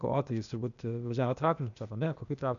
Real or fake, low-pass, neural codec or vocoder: fake; 7.2 kHz; codec, 16 kHz, 0.8 kbps, ZipCodec